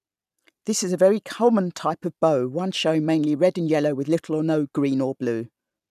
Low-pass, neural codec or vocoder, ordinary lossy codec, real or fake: 14.4 kHz; none; none; real